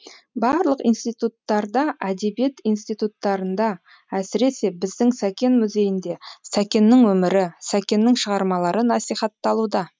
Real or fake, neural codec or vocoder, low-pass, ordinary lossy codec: real; none; none; none